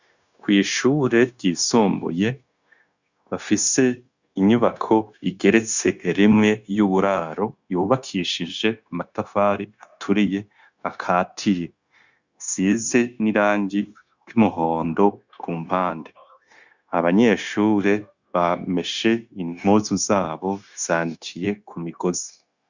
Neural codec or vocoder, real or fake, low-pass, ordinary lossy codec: codec, 16 kHz, 0.9 kbps, LongCat-Audio-Codec; fake; 7.2 kHz; Opus, 64 kbps